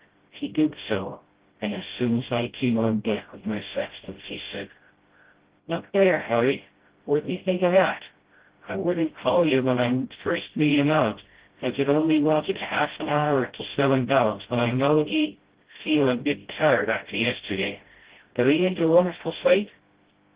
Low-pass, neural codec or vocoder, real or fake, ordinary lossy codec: 3.6 kHz; codec, 16 kHz, 0.5 kbps, FreqCodec, smaller model; fake; Opus, 16 kbps